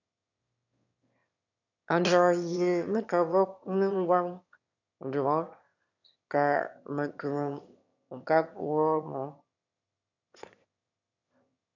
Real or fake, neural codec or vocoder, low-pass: fake; autoencoder, 22.05 kHz, a latent of 192 numbers a frame, VITS, trained on one speaker; 7.2 kHz